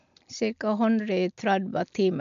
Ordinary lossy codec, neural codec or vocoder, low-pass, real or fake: none; none; 7.2 kHz; real